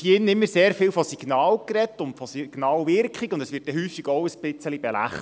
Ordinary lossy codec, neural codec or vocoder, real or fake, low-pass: none; none; real; none